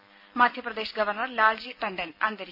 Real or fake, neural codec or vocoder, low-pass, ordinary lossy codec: real; none; 5.4 kHz; none